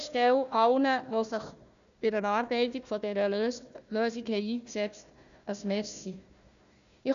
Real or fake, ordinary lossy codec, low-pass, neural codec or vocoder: fake; none; 7.2 kHz; codec, 16 kHz, 1 kbps, FunCodec, trained on Chinese and English, 50 frames a second